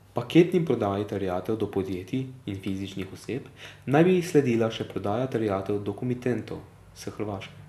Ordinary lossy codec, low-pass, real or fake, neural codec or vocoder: none; 14.4 kHz; real; none